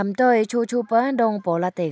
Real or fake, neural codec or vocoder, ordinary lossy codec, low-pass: real; none; none; none